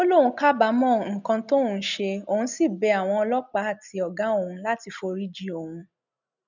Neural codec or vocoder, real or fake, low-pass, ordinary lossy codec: none; real; 7.2 kHz; none